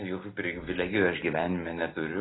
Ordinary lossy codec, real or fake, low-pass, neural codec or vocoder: AAC, 16 kbps; real; 7.2 kHz; none